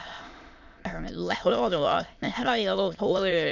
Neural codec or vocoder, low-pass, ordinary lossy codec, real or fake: autoencoder, 22.05 kHz, a latent of 192 numbers a frame, VITS, trained on many speakers; 7.2 kHz; none; fake